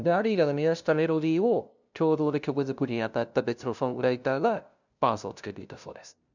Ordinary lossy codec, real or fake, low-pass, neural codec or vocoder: none; fake; 7.2 kHz; codec, 16 kHz, 0.5 kbps, FunCodec, trained on LibriTTS, 25 frames a second